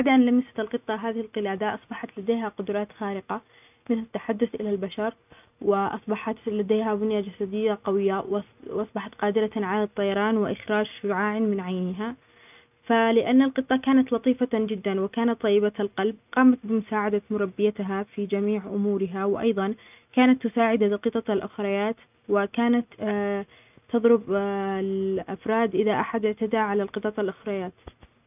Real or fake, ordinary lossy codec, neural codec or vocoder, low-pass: real; none; none; 3.6 kHz